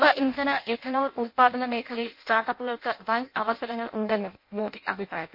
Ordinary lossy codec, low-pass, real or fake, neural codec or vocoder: MP3, 32 kbps; 5.4 kHz; fake; codec, 16 kHz in and 24 kHz out, 0.6 kbps, FireRedTTS-2 codec